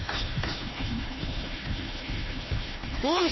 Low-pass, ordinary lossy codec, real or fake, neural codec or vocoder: 7.2 kHz; MP3, 24 kbps; fake; codec, 16 kHz, 1 kbps, FunCodec, trained on Chinese and English, 50 frames a second